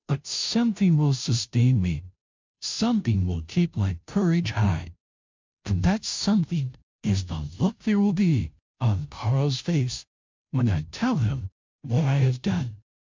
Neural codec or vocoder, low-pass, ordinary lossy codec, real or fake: codec, 16 kHz, 0.5 kbps, FunCodec, trained on Chinese and English, 25 frames a second; 7.2 kHz; MP3, 64 kbps; fake